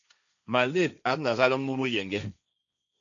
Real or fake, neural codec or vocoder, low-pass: fake; codec, 16 kHz, 1.1 kbps, Voila-Tokenizer; 7.2 kHz